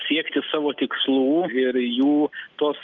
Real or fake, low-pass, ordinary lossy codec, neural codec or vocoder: real; 7.2 kHz; Opus, 24 kbps; none